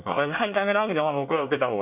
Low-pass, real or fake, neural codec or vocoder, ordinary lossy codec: 3.6 kHz; fake; codec, 24 kHz, 1 kbps, SNAC; none